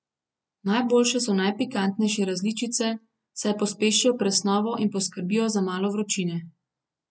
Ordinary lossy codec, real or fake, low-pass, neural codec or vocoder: none; real; none; none